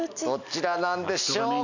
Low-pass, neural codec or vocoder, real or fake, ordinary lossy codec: 7.2 kHz; none; real; none